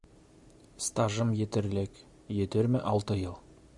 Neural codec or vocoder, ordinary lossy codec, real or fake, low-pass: none; Opus, 64 kbps; real; 10.8 kHz